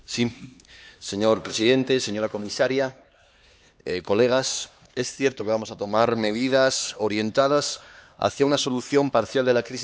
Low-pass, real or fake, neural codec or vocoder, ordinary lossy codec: none; fake; codec, 16 kHz, 2 kbps, X-Codec, HuBERT features, trained on LibriSpeech; none